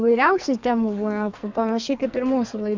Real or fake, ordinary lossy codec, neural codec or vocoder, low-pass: fake; MP3, 64 kbps; codec, 44.1 kHz, 2.6 kbps, SNAC; 7.2 kHz